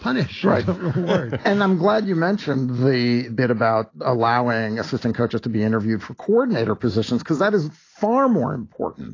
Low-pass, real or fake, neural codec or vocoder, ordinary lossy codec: 7.2 kHz; real; none; AAC, 32 kbps